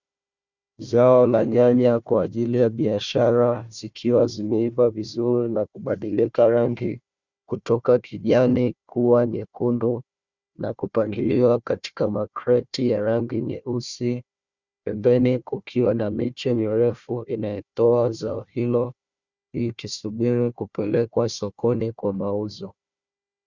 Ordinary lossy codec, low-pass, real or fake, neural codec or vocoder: Opus, 64 kbps; 7.2 kHz; fake; codec, 16 kHz, 1 kbps, FunCodec, trained on Chinese and English, 50 frames a second